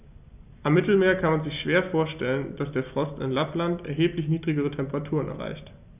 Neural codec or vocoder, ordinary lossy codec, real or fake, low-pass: none; none; real; 3.6 kHz